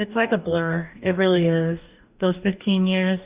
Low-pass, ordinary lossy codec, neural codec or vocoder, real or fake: 3.6 kHz; Opus, 64 kbps; codec, 44.1 kHz, 2.6 kbps, DAC; fake